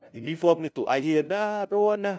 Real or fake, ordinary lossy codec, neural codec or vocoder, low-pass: fake; none; codec, 16 kHz, 0.5 kbps, FunCodec, trained on LibriTTS, 25 frames a second; none